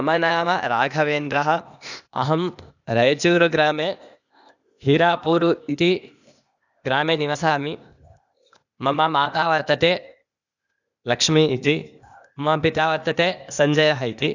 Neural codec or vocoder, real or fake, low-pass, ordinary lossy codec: codec, 16 kHz, 0.8 kbps, ZipCodec; fake; 7.2 kHz; none